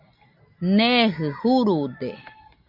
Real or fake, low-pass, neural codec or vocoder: real; 5.4 kHz; none